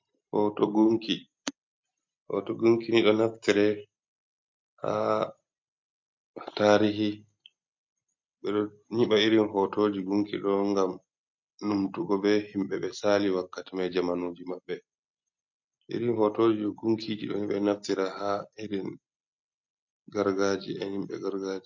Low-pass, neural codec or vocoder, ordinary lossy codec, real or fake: 7.2 kHz; none; MP3, 48 kbps; real